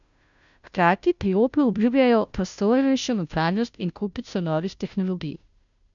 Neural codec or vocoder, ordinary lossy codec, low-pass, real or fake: codec, 16 kHz, 0.5 kbps, FunCodec, trained on Chinese and English, 25 frames a second; none; 7.2 kHz; fake